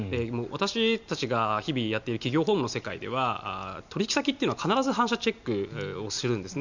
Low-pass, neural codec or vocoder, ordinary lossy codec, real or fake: 7.2 kHz; none; none; real